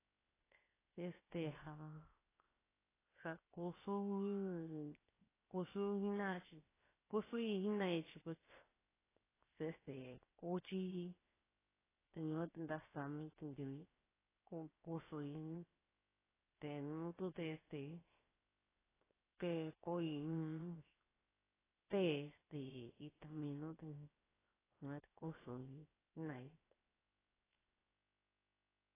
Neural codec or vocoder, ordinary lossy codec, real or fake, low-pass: codec, 16 kHz, 0.7 kbps, FocalCodec; AAC, 16 kbps; fake; 3.6 kHz